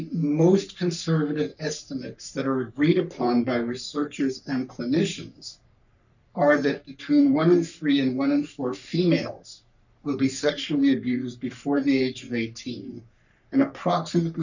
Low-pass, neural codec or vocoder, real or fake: 7.2 kHz; codec, 44.1 kHz, 3.4 kbps, Pupu-Codec; fake